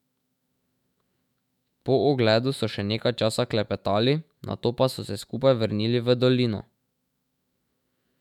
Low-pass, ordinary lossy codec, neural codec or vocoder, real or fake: 19.8 kHz; none; autoencoder, 48 kHz, 128 numbers a frame, DAC-VAE, trained on Japanese speech; fake